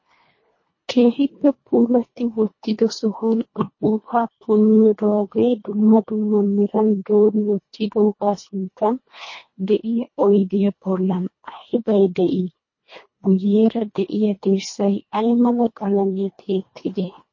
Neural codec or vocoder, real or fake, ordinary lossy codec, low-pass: codec, 24 kHz, 1.5 kbps, HILCodec; fake; MP3, 32 kbps; 7.2 kHz